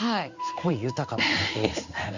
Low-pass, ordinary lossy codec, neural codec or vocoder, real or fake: 7.2 kHz; Opus, 64 kbps; codec, 16 kHz in and 24 kHz out, 1 kbps, XY-Tokenizer; fake